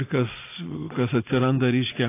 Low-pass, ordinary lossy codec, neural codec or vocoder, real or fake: 3.6 kHz; AAC, 24 kbps; none; real